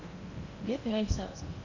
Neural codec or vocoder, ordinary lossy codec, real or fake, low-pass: codec, 16 kHz in and 24 kHz out, 0.8 kbps, FocalCodec, streaming, 65536 codes; none; fake; 7.2 kHz